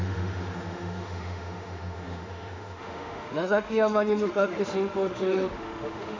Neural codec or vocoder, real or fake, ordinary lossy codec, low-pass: autoencoder, 48 kHz, 32 numbers a frame, DAC-VAE, trained on Japanese speech; fake; MP3, 64 kbps; 7.2 kHz